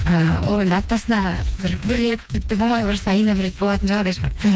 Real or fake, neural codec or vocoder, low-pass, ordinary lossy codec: fake; codec, 16 kHz, 2 kbps, FreqCodec, smaller model; none; none